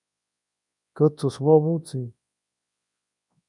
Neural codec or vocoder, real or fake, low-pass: codec, 24 kHz, 0.9 kbps, WavTokenizer, large speech release; fake; 10.8 kHz